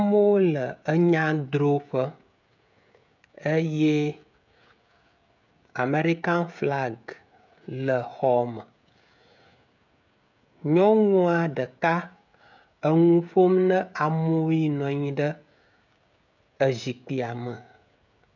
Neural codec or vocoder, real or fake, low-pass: codec, 16 kHz, 16 kbps, FreqCodec, smaller model; fake; 7.2 kHz